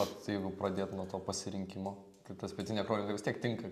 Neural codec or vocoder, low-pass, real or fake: none; 14.4 kHz; real